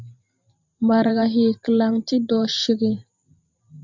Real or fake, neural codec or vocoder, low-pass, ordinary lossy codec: fake; vocoder, 44.1 kHz, 128 mel bands every 512 samples, BigVGAN v2; 7.2 kHz; MP3, 64 kbps